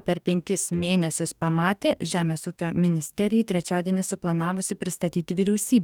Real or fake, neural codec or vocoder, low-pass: fake; codec, 44.1 kHz, 2.6 kbps, DAC; 19.8 kHz